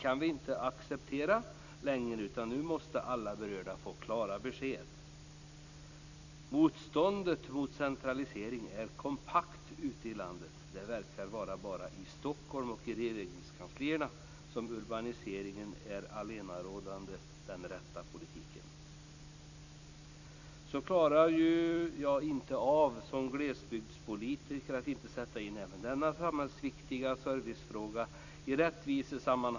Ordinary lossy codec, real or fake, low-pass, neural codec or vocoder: none; real; 7.2 kHz; none